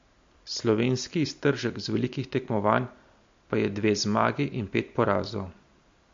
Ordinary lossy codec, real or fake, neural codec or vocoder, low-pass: MP3, 48 kbps; real; none; 7.2 kHz